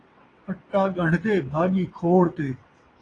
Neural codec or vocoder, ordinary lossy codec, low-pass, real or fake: vocoder, 22.05 kHz, 80 mel bands, WaveNeXt; AAC, 32 kbps; 9.9 kHz; fake